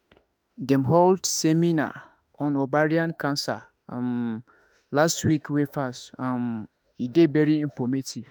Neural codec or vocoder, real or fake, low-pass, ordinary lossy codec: autoencoder, 48 kHz, 32 numbers a frame, DAC-VAE, trained on Japanese speech; fake; none; none